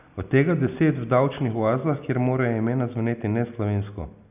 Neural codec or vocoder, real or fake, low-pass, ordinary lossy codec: none; real; 3.6 kHz; none